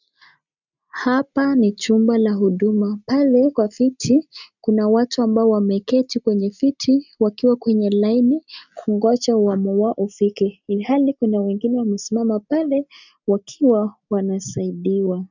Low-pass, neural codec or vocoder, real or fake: 7.2 kHz; none; real